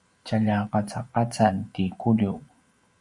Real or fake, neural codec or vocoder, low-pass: fake; vocoder, 44.1 kHz, 128 mel bands every 512 samples, BigVGAN v2; 10.8 kHz